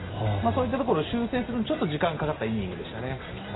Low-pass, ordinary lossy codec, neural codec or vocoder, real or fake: 7.2 kHz; AAC, 16 kbps; none; real